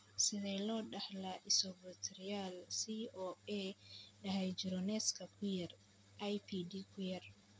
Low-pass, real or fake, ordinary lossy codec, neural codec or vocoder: none; real; none; none